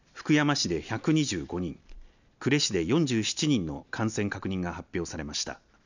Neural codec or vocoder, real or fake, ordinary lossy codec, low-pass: none; real; none; 7.2 kHz